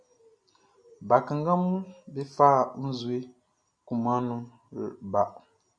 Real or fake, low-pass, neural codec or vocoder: real; 9.9 kHz; none